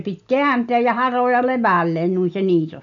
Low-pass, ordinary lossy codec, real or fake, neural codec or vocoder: 7.2 kHz; none; real; none